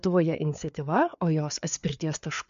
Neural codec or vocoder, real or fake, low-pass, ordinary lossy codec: codec, 16 kHz, 4 kbps, FreqCodec, larger model; fake; 7.2 kHz; MP3, 64 kbps